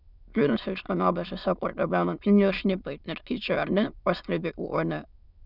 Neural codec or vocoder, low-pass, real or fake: autoencoder, 22.05 kHz, a latent of 192 numbers a frame, VITS, trained on many speakers; 5.4 kHz; fake